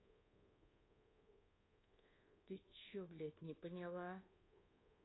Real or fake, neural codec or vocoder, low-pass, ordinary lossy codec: fake; codec, 24 kHz, 1.2 kbps, DualCodec; 7.2 kHz; AAC, 16 kbps